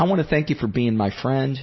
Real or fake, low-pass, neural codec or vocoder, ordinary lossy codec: real; 7.2 kHz; none; MP3, 24 kbps